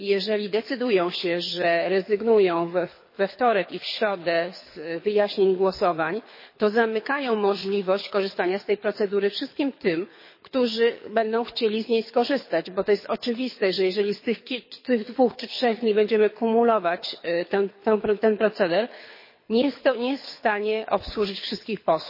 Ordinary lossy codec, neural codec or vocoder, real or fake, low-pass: MP3, 24 kbps; codec, 24 kHz, 6 kbps, HILCodec; fake; 5.4 kHz